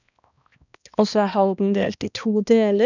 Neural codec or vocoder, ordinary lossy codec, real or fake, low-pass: codec, 16 kHz, 1 kbps, X-Codec, HuBERT features, trained on balanced general audio; none; fake; 7.2 kHz